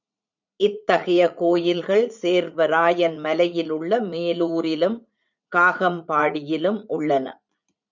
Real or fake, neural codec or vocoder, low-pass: fake; vocoder, 44.1 kHz, 80 mel bands, Vocos; 7.2 kHz